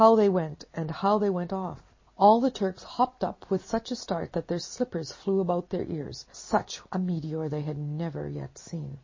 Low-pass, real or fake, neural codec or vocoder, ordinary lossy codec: 7.2 kHz; real; none; MP3, 32 kbps